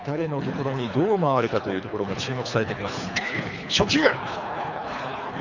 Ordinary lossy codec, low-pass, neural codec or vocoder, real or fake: none; 7.2 kHz; codec, 24 kHz, 3 kbps, HILCodec; fake